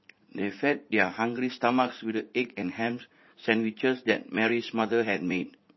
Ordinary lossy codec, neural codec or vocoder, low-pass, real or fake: MP3, 24 kbps; vocoder, 44.1 kHz, 80 mel bands, Vocos; 7.2 kHz; fake